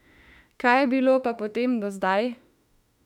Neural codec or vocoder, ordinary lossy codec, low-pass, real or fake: autoencoder, 48 kHz, 32 numbers a frame, DAC-VAE, trained on Japanese speech; none; 19.8 kHz; fake